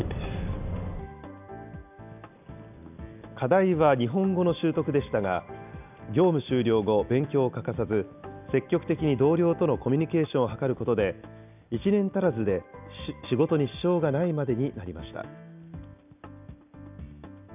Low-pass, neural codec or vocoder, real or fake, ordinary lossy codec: 3.6 kHz; none; real; none